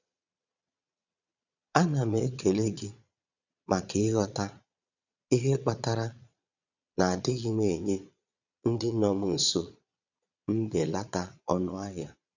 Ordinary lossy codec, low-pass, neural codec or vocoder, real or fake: none; 7.2 kHz; vocoder, 22.05 kHz, 80 mel bands, Vocos; fake